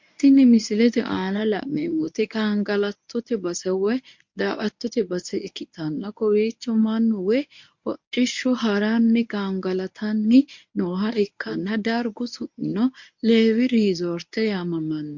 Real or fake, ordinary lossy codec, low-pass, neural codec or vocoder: fake; MP3, 48 kbps; 7.2 kHz; codec, 24 kHz, 0.9 kbps, WavTokenizer, medium speech release version 1